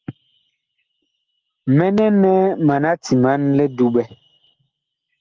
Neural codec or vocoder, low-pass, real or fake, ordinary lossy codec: none; 7.2 kHz; real; Opus, 16 kbps